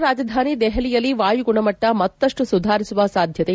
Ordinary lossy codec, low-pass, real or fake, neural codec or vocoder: none; none; real; none